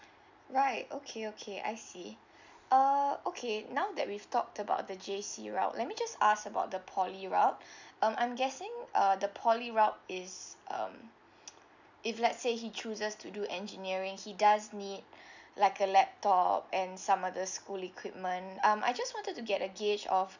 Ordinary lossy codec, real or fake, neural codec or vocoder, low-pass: none; real; none; 7.2 kHz